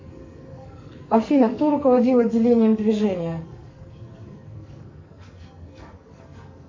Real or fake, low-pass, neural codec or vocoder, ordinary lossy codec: fake; 7.2 kHz; codec, 44.1 kHz, 2.6 kbps, SNAC; MP3, 48 kbps